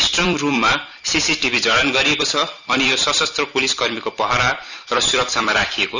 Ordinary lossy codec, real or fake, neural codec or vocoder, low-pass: none; fake; vocoder, 44.1 kHz, 128 mel bands every 256 samples, BigVGAN v2; 7.2 kHz